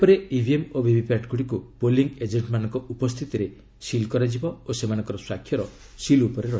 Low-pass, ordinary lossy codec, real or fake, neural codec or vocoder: none; none; real; none